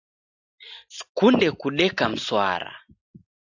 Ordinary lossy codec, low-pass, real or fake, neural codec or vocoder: AAC, 48 kbps; 7.2 kHz; real; none